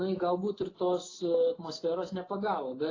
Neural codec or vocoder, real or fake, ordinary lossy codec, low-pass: none; real; AAC, 32 kbps; 7.2 kHz